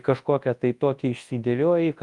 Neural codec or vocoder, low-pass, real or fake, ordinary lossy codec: codec, 24 kHz, 0.9 kbps, WavTokenizer, large speech release; 10.8 kHz; fake; Opus, 32 kbps